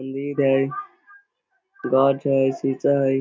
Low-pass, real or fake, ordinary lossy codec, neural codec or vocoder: none; real; none; none